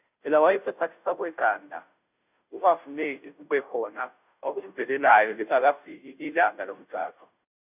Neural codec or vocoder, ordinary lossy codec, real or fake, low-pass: codec, 16 kHz, 0.5 kbps, FunCodec, trained on Chinese and English, 25 frames a second; none; fake; 3.6 kHz